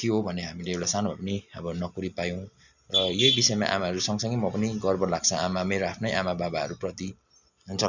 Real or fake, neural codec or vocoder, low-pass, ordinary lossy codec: real; none; 7.2 kHz; none